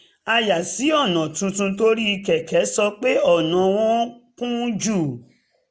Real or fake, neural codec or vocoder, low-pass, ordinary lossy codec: real; none; none; none